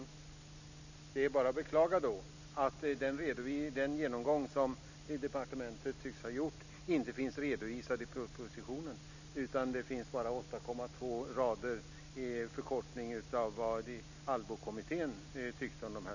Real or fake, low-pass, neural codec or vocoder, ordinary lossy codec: real; 7.2 kHz; none; none